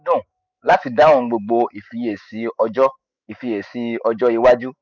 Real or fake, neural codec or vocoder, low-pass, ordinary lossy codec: fake; autoencoder, 48 kHz, 128 numbers a frame, DAC-VAE, trained on Japanese speech; 7.2 kHz; none